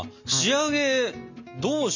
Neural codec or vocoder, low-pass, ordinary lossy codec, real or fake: none; 7.2 kHz; none; real